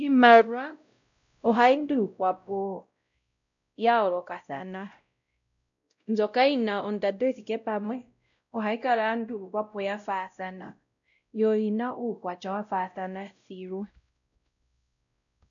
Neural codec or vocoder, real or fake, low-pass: codec, 16 kHz, 0.5 kbps, X-Codec, WavLM features, trained on Multilingual LibriSpeech; fake; 7.2 kHz